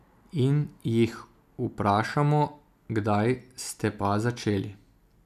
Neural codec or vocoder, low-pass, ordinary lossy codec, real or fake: none; 14.4 kHz; none; real